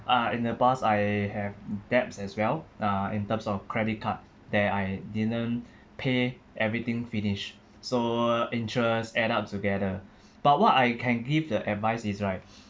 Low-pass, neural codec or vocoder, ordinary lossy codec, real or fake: none; none; none; real